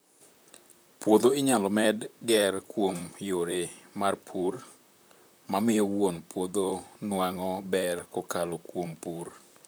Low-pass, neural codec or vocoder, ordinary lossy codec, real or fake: none; vocoder, 44.1 kHz, 128 mel bands, Pupu-Vocoder; none; fake